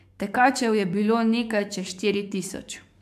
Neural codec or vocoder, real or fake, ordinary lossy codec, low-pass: codec, 44.1 kHz, 7.8 kbps, DAC; fake; AAC, 96 kbps; 14.4 kHz